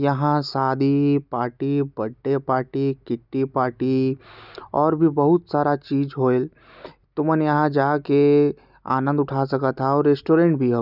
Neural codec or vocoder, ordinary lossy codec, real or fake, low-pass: none; none; real; 5.4 kHz